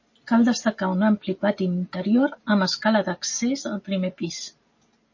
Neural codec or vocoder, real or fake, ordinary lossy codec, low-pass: none; real; MP3, 32 kbps; 7.2 kHz